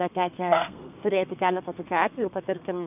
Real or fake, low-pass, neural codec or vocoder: fake; 3.6 kHz; codec, 32 kHz, 1.9 kbps, SNAC